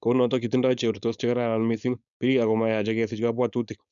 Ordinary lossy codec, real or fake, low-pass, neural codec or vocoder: none; fake; 7.2 kHz; codec, 16 kHz, 4.8 kbps, FACodec